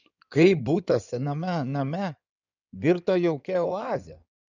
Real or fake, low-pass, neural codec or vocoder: fake; 7.2 kHz; codec, 16 kHz in and 24 kHz out, 2.2 kbps, FireRedTTS-2 codec